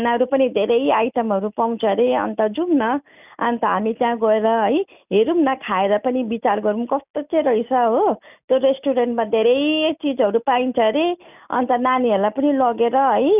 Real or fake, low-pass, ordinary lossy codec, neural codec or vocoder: real; 3.6 kHz; none; none